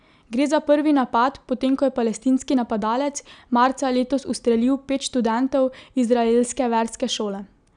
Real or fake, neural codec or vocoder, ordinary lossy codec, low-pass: real; none; none; 9.9 kHz